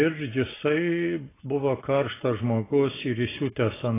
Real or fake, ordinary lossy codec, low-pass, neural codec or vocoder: real; AAC, 16 kbps; 3.6 kHz; none